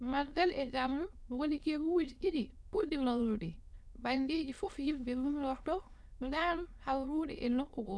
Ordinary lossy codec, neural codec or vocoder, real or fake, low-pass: none; autoencoder, 22.05 kHz, a latent of 192 numbers a frame, VITS, trained on many speakers; fake; none